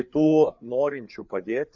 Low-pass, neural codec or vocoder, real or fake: 7.2 kHz; codec, 16 kHz, 4 kbps, FreqCodec, larger model; fake